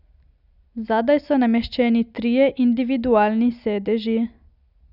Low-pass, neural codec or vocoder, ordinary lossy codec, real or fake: 5.4 kHz; none; none; real